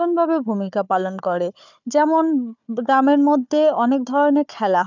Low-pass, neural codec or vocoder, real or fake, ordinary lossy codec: 7.2 kHz; codec, 16 kHz, 4 kbps, FunCodec, trained on Chinese and English, 50 frames a second; fake; none